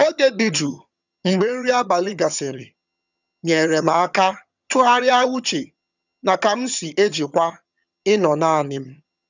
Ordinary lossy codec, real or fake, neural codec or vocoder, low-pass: none; fake; vocoder, 22.05 kHz, 80 mel bands, HiFi-GAN; 7.2 kHz